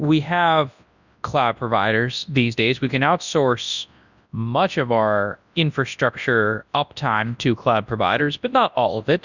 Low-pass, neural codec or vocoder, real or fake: 7.2 kHz; codec, 24 kHz, 0.9 kbps, WavTokenizer, large speech release; fake